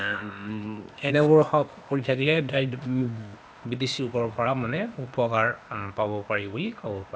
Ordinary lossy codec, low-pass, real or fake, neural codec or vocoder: none; none; fake; codec, 16 kHz, 0.8 kbps, ZipCodec